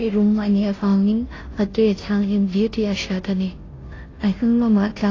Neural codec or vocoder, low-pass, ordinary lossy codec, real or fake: codec, 16 kHz, 0.5 kbps, FunCodec, trained on Chinese and English, 25 frames a second; 7.2 kHz; AAC, 32 kbps; fake